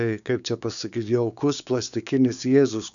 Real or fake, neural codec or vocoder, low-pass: fake; codec, 16 kHz, 6 kbps, DAC; 7.2 kHz